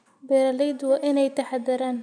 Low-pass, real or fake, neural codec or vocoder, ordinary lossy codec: 9.9 kHz; real; none; none